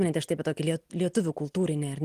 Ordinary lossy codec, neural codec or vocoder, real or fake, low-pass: Opus, 24 kbps; none; real; 14.4 kHz